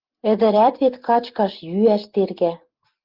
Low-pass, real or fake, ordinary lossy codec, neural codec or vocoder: 5.4 kHz; real; Opus, 32 kbps; none